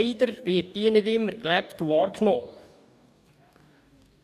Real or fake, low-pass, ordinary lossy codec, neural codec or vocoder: fake; 14.4 kHz; none; codec, 44.1 kHz, 2.6 kbps, DAC